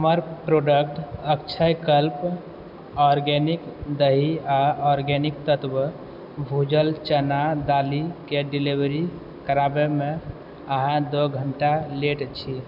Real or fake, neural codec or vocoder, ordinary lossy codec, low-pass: real; none; none; 5.4 kHz